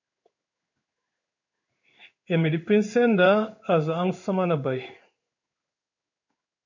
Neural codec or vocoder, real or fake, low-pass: codec, 16 kHz in and 24 kHz out, 1 kbps, XY-Tokenizer; fake; 7.2 kHz